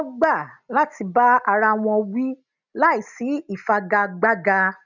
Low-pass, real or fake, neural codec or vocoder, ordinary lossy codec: 7.2 kHz; real; none; none